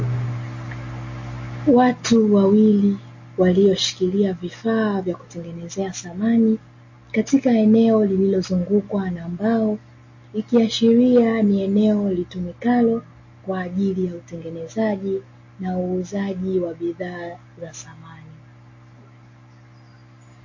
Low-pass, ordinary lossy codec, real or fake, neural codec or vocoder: 7.2 kHz; MP3, 32 kbps; real; none